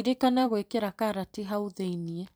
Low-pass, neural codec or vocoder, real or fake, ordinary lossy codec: none; vocoder, 44.1 kHz, 128 mel bands every 256 samples, BigVGAN v2; fake; none